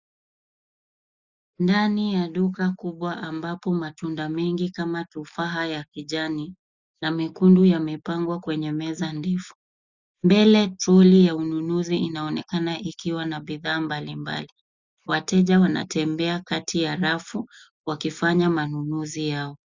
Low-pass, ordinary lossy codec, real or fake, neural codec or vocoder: 7.2 kHz; Opus, 64 kbps; real; none